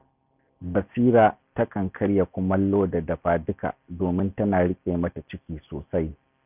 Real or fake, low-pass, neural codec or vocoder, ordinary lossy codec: real; 3.6 kHz; none; none